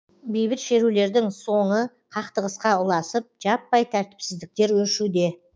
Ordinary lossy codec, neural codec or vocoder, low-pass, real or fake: none; codec, 16 kHz, 6 kbps, DAC; none; fake